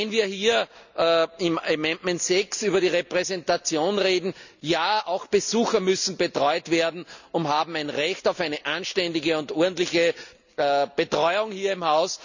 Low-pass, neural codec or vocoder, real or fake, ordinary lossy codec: 7.2 kHz; none; real; none